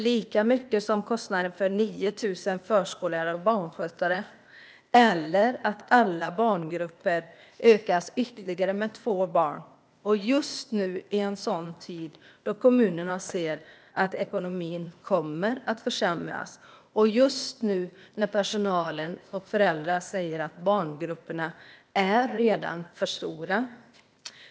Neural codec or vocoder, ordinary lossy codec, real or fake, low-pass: codec, 16 kHz, 0.8 kbps, ZipCodec; none; fake; none